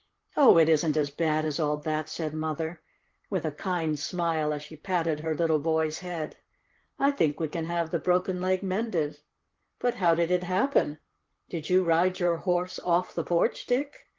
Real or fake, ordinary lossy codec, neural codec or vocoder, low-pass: fake; Opus, 16 kbps; vocoder, 44.1 kHz, 128 mel bands, Pupu-Vocoder; 7.2 kHz